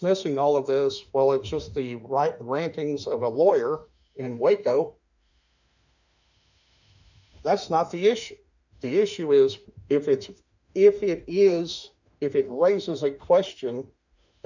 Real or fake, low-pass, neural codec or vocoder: fake; 7.2 kHz; autoencoder, 48 kHz, 32 numbers a frame, DAC-VAE, trained on Japanese speech